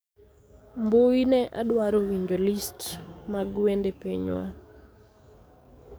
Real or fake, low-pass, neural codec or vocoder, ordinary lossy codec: fake; none; codec, 44.1 kHz, 7.8 kbps, DAC; none